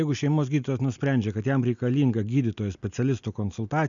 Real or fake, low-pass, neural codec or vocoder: real; 7.2 kHz; none